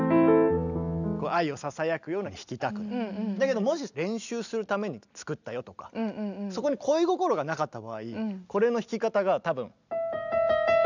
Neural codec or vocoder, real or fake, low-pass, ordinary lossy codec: none; real; 7.2 kHz; none